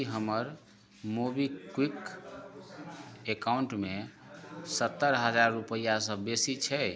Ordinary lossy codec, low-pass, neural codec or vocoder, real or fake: none; none; none; real